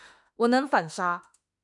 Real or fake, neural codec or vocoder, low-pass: fake; autoencoder, 48 kHz, 32 numbers a frame, DAC-VAE, trained on Japanese speech; 10.8 kHz